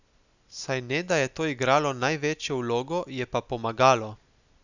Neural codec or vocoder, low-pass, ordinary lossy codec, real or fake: none; 7.2 kHz; none; real